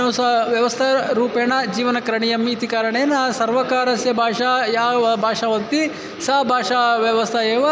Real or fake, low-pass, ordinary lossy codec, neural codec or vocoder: real; none; none; none